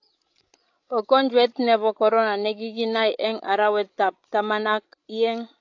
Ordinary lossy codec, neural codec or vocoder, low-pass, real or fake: AAC, 48 kbps; codec, 16 kHz, 16 kbps, FreqCodec, larger model; 7.2 kHz; fake